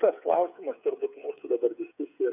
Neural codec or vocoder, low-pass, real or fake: codec, 16 kHz, 8 kbps, FreqCodec, smaller model; 3.6 kHz; fake